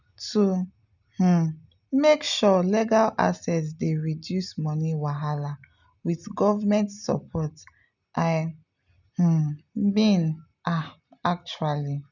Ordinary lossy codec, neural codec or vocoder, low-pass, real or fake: none; none; 7.2 kHz; real